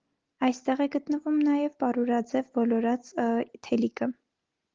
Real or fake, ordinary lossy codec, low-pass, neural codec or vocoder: real; Opus, 16 kbps; 7.2 kHz; none